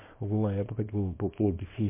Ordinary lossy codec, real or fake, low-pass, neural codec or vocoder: MP3, 24 kbps; fake; 3.6 kHz; codec, 24 kHz, 0.9 kbps, WavTokenizer, small release